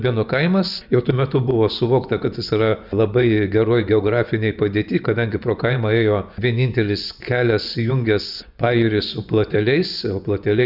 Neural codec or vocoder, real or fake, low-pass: vocoder, 24 kHz, 100 mel bands, Vocos; fake; 5.4 kHz